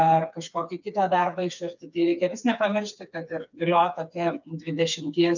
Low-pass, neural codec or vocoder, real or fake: 7.2 kHz; codec, 16 kHz, 4 kbps, FreqCodec, smaller model; fake